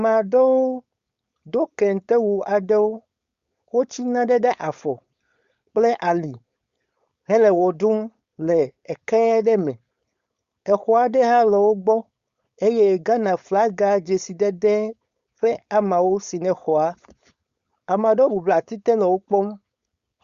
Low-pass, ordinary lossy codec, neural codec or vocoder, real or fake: 7.2 kHz; Opus, 64 kbps; codec, 16 kHz, 4.8 kbps, FACodec; fake